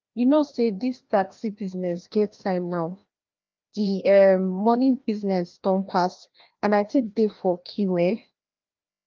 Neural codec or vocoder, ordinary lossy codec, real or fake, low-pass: codec, 16 kHz, 1 kbps, FreqCodec, larger model; Opus, 24 kbps; fake; 7.2 kHz